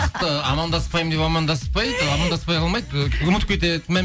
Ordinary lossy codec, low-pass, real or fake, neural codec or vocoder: none; none; real; none